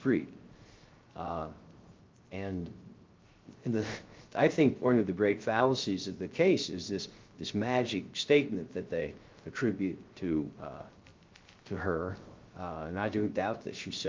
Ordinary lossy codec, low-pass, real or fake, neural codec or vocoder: Opus, 32 kbps; 7.2 kHz; fake; codec, 16 kHz, 0.3 kbps, FocalCodec